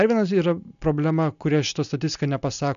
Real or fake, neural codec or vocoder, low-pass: real; none; 7.2 kHz